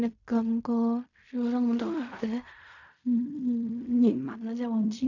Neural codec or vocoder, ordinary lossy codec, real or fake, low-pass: codec, 16 kHz in and 24 kHz out, 0.4 kbps, LongCat-Audio-Codec, fine tuned four codebook decoder; none; fake; 7.2 kHz